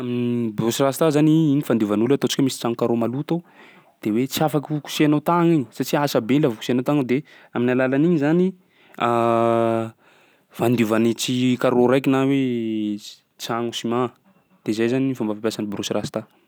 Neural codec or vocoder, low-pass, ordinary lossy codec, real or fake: none; none; none; real